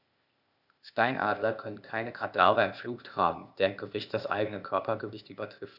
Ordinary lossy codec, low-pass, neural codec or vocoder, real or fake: none; 5.4 kHz; codec, 16 kHz, 0.8 kbps, ZipCodec; fake